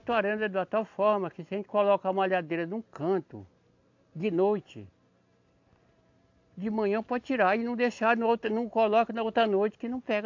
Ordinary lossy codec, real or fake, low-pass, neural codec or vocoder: AAC, 48 kbps; real; 7.2 kHz; none